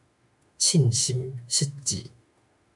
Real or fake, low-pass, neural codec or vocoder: fake; 10.8 kHz; autoencoder, 48 kHz, 32 numbers a frame, DAC-VAE, trained on Japanese speech